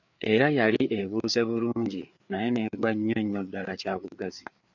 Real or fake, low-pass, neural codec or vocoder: fake; 7.2 kHz; codec, 16 kHz, 4 kbps, FreqCodec, larger model